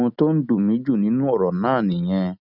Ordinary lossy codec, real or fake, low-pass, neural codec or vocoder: none; real; 5.4 kHz; none